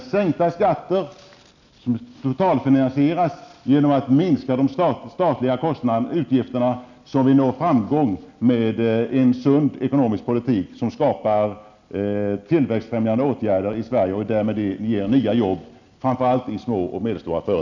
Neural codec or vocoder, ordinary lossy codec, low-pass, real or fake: none; none; 7.2 kHz; real